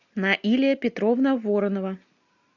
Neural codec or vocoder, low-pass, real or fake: none; 7.2 kHz; real